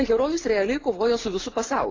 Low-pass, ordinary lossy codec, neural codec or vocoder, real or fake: 7.2 kHz; AAC, 32 kbps; none; real